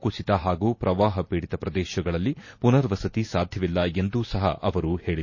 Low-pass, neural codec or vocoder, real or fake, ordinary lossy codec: 7.2 kHz; none; real; MP3, 32 kbps